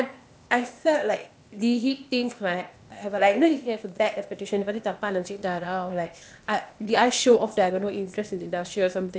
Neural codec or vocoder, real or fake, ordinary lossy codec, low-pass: codec, 16 kHz, 0.8 kbps, ZipCodec; fake; none; none